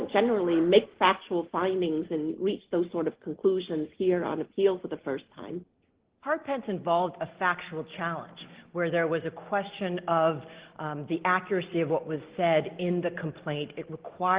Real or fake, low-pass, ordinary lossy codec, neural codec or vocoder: real; 3.6 kHz; Opus, 16 kbps; none